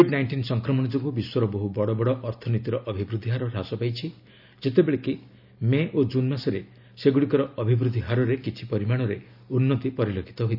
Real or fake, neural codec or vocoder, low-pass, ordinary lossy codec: real; none; 5.4 kHz; none